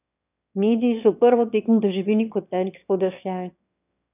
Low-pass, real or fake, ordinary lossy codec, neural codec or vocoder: 3.6 kHz; fake; none; autoencoder, 22.05 kHz, a latent of 192 numbers a frame, VITS, trained on one speaker